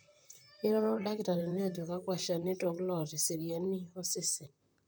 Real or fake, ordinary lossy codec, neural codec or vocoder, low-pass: fake; none; vocoder, 44.1 kHz, 128 mel bands, Pupu-Vocoder; none